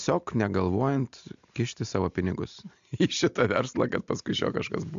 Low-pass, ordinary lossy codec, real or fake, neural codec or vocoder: 7.2 kHz; AAC, 64 kbps; real; none